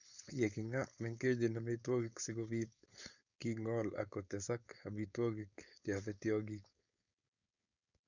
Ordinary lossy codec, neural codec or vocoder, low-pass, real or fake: none; codec, 16 kHz, 4.8 kbps, FACodec; 7.2 kHz; fake